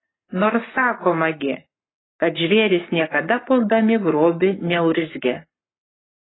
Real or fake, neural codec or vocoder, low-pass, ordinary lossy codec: fake; codec, 44.1 kHz, 7.8 kbps, Pupu-Codec; 7.2 kHz; AAC, 16 kbps